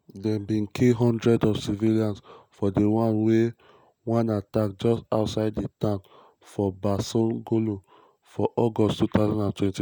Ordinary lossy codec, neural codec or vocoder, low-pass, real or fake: none; none; none; real